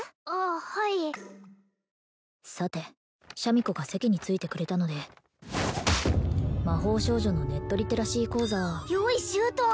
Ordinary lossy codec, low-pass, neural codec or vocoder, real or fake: none; none; none; real